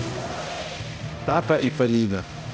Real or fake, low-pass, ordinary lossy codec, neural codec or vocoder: fake; none; none; codec, 16 kHz, 0.5 kbps, X-Codec, HuBERT features, trained on balanced general audio